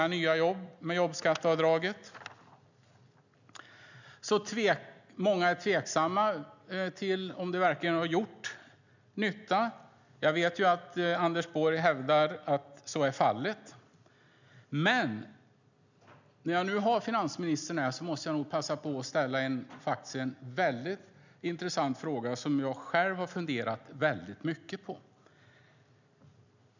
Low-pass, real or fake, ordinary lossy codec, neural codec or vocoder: 7.2 kHz; real; none; none